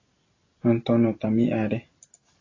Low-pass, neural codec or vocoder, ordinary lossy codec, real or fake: 7.2 kHz; none; AAC, 32 kbps; real